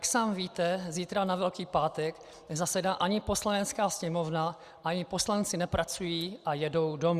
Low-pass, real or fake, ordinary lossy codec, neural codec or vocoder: 14.4 kHz; real; Opus, 64 kbps; none